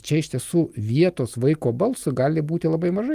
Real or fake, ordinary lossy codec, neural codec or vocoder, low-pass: real; Opus, 32 kbps; none; 14.4 kHz